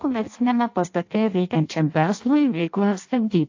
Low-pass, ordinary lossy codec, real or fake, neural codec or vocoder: 7.2 kHz; AAC, 48 kbps; fake; codec, 16 kHz in and 24 kHz out, 0.6 kbps, FireRedTTS-2 codec